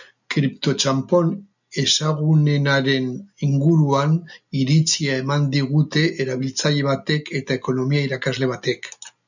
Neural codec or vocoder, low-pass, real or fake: none; 7.2 kHz; real